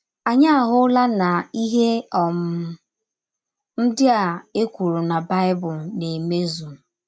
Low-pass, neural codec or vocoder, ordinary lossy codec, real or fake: none; none; none; real